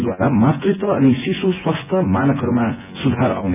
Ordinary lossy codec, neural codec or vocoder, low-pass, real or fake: none; vocoder, 24 kHz, 100 mel bands, Vocos; 3.6 kHz; fake